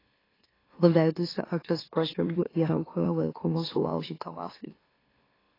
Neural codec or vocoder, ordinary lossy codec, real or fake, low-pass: autoencoder, 44.1 kHz, a latent of 192 numbers a frame, MeloTTS; AAC, 24 kbps; fake; 5.4 kHz